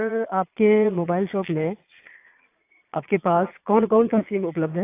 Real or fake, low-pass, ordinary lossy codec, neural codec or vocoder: fake; 3.6 kHz; AAC, 24 kbps; vocoder, 22.05 kHz, 80 mel bands, Vocos